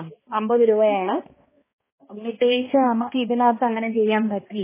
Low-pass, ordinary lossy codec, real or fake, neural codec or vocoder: 3.6 kHz; MP3, 16 kbps; fake; codec, 16 kHz, 1 kbps, X-Codec, HuBERT features, trained on balanced general audio